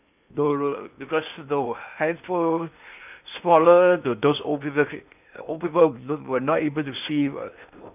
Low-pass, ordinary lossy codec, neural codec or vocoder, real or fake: 3.6 kHz; none; codec, 16 kHz in and 24 kHz out, 0.8 kbps, FocalCodec, streaming, 65536 codes; fake